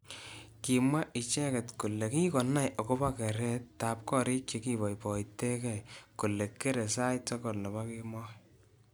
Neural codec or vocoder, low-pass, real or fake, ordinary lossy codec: none; none; real; none